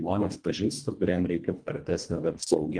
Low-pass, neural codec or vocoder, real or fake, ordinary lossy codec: 9.9 kHz; codec, 24 kHz, 1.5 kbps, HILCodec; fake; Opus, 32 kbps